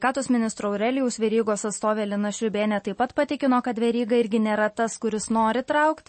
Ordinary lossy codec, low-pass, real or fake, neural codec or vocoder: MP3, 32 kbps; 9.9 kHz; real; none